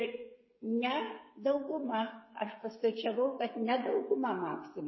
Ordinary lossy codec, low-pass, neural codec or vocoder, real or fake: MP3, 24 kbps; 7.2 kHz; codec, 16 kHz, 16 kbps, FreqCodec, smaller model; fake